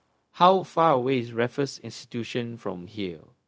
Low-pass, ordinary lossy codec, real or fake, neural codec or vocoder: none; none; fake; codec, 16 kHz, 0.4 kbps, LongCat-Audio-Codec